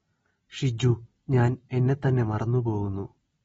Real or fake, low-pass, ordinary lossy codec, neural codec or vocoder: real; 19.8 kHz; AAC, 24 kbps; none